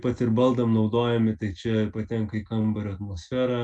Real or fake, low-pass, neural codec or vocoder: real; 10.8 kHz; none